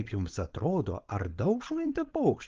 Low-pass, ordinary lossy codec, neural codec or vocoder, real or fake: 7.2 kHz; Opus, 16 kbps; codec, 16 kHz, 4.8 kbps, FACodec; fake